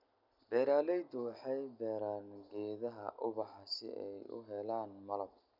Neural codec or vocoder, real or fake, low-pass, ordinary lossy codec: none; real; 5.4 kHz; none